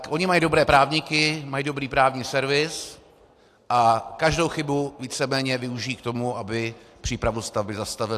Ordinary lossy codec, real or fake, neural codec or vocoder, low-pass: AAC, 64 kbps; real; none; 14.4 kHz